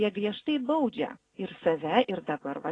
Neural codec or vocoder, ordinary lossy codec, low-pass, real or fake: none; AAC, 32 kbps; 9.9 kHz; real